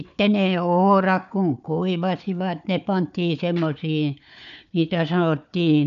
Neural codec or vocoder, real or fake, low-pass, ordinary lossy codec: codec, 16 kHz, 4 kbps, FunCodec, trained on Chinese and English, 50 frames a second; fake; 7.2 kHz; none